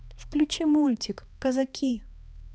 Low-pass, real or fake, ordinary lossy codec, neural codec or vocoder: none; fake; none; codec, 16 kHz, 2 kbps, X-Codec, HuBERT features, trained on balanced general audio